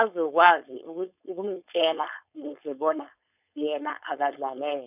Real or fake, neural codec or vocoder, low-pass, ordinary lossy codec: fake; codec, 16 kHz, 4.8 kbps, FACodec; 3.6 kHz; none